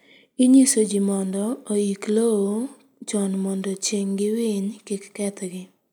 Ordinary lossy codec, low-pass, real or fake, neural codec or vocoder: none; none; real; none